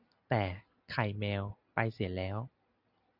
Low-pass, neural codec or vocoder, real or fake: 5.4 kHz; none; real